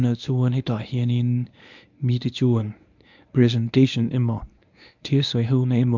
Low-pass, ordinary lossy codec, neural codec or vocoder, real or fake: 7.2 kHz; none; codec, 24 kHz, 0.9 kbps, WavTokenizer, medium speech release version 1; fake